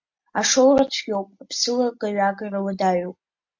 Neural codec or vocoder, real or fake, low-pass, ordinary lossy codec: none; real; 7.2 kHz; MP3, 48 kbps